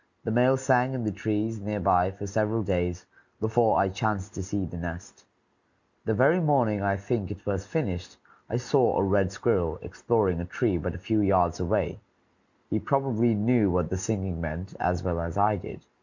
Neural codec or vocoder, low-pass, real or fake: none; 7.2 kHz; real